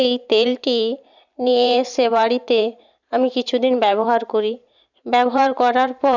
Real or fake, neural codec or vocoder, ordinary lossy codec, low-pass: fake; vocoder, 44.1 kHz, 128 mel bands every 512 samples, BigVGAN v2; none; 7.2 kHz